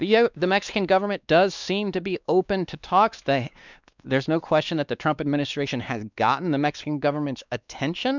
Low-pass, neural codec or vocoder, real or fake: 7.2 kHz; codec, 16 kHz, 2 kbps, X-Codec, WavLM features, trained on Multilingual LibriSpeech; fake